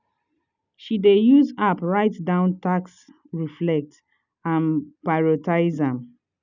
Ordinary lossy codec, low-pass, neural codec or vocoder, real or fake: none; 7.2 kHz; none; real